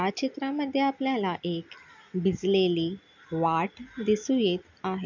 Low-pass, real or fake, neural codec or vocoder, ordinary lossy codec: 7.2 kHz; real; none; none